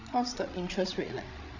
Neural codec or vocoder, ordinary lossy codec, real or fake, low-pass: codec, 16 kHz, 16 kbps, FreqCodec, larger model; none; fake; 7.2 kHz